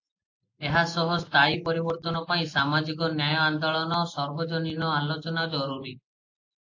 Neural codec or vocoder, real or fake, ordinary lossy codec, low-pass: none; real; AAC, 48 kbps; 7.2 kHz